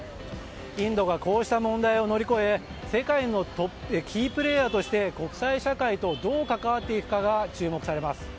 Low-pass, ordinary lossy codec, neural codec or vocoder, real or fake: none; none; none; real